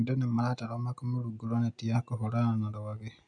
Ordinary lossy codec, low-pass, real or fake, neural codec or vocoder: none; 9.9 kHz; real; none